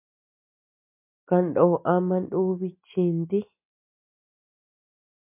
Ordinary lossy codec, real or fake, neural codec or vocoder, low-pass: MP3, 24 kbps; real; none; 3.6 kHz